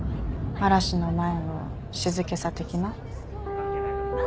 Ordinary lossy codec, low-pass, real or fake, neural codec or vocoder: none; none; real; none